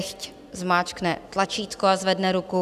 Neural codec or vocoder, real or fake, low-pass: none; real; 14.4 kHz